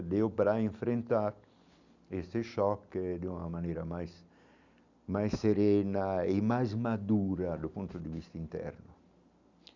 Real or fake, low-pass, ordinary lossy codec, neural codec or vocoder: real; 7.2 kHz; none; none